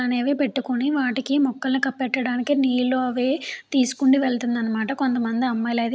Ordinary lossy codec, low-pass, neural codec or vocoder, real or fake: none; none; none; real